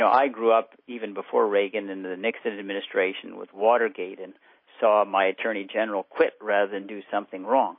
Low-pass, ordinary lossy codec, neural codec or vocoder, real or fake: 5.4 kHz; MP3, 24 kbps; none; real